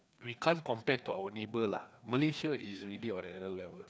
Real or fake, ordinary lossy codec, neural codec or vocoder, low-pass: fake; none; codec, 16 kHz, 2 kbps, FreqCodec, larger model; none